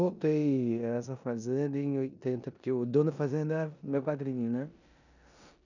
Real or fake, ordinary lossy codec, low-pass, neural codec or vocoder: fake; none; 7.2 kHz; codec, 16 kHz in and 24 kHz out, 0.9 kbps, LongCat-Audio-Codec, four codebook decoder